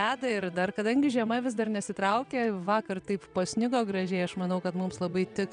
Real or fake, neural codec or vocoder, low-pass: fake; vocoder, 22.05 kHz, 80 mel bands, WaveNeXt; 9.9 kHz